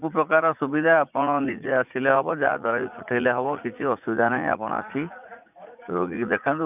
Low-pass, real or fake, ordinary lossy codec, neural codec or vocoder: 3.6 kHz; fake; none; vocoder, 44.1 kHz, 80 mel bands, Vocos